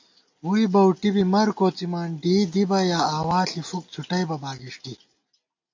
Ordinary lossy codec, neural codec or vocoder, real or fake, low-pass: AAC, 48 kbps; none; real; 7.2 kHz